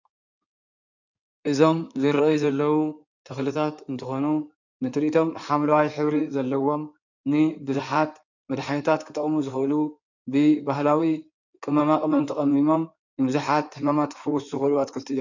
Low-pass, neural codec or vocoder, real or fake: 7.2 kHz; codec, 16 kHz in and 24 kHz out, 2.2 kbps, FireRedTTS-2 codec; fake